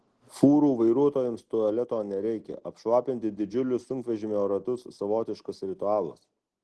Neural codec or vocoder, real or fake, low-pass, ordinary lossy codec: none; real; 10.8 kHz; Opus, 16 kbps